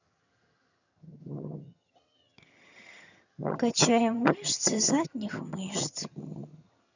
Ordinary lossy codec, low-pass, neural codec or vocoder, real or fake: none; 7.2 kHz; vocoder, 22.05 kHz, 80 mel bands, HiFi-GAN; fake